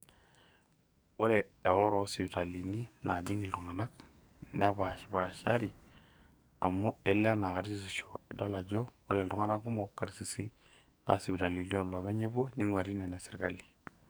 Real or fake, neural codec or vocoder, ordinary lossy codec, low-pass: fake; codec, 44.1 kHz, 2.6 kbps, SNAC; none; none